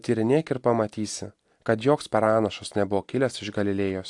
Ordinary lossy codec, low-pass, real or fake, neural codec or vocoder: AAC, 64 kbps; 10.8 kHz; real; none